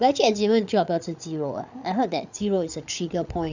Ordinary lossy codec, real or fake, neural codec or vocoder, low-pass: none; fake; codec, 16 kHz, 8 kbps, FreqCodec, larger model; 7.2 kHz